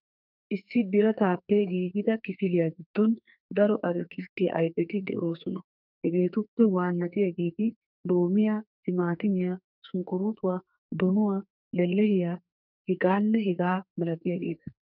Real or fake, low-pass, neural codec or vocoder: fake; 5.4 kHz; codec, 44.1 kHz, 2.6 kbps, SNAC